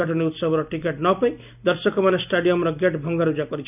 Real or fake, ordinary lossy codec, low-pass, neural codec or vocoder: real; none; 3.6 kHz; none